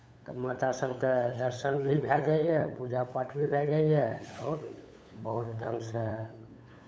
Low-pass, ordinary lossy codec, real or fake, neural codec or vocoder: none; none; fake; codec, 16 kHz, 8 kbps, FunCodec, trained on LibriTTS, 25 frames a second